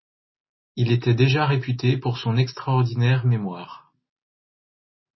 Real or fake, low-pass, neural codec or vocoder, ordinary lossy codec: real; 7.2 kHz; none; MP3, 24 kbps